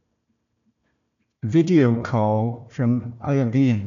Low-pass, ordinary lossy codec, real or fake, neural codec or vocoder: 7.2 kHz; none; fake; codec, 16 kHz, 1 kbps, FunCodec, trained on Chinese and English, 50 frames a second